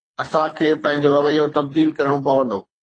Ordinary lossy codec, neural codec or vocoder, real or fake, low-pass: AAC, 32 kbps; codec, 24 kHz, 3 kbps, HILCodec; fake; 9.9 kHz